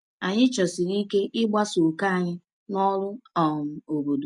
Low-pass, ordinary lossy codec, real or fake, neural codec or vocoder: 10.8 kHz; none; real; none